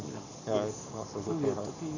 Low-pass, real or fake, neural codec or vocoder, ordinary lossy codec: 7.2 kHz; fake; codec, 44.1 kHz, 7.8 kbps, Pupu-Codec; none